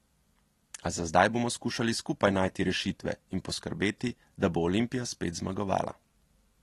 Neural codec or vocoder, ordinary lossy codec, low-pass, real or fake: none; AAC, 32 kbps; 19.8 kHz; real